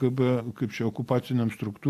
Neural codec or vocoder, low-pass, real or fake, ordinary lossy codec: none; 14.4 kHz; real; AAC, 64 kbps